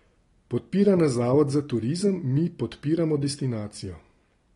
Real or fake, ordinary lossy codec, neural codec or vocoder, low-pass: real; AAC, 32 kbps; none; 10.8 kHz